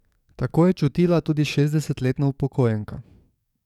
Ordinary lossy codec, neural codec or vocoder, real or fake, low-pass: none; codec, 44.1 kHz, 7.8 kbps, DAC; fake; 19.8 kHz